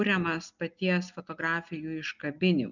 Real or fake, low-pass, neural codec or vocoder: real; 7.2 kHz; none